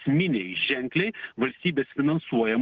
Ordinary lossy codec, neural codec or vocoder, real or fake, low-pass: Opus, 16 kbps; none; real; 7.2 kHz